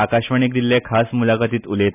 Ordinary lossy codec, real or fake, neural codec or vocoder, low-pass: none; real; none; 3.6 kHz